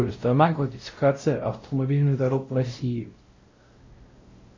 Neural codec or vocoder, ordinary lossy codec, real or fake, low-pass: codec, 16 kHz, 0.5 kbps, X-Codec, WavLM features, trained on Multilingual LibriSpeech; MP3, 32 kbps; fake; 7.2 kHz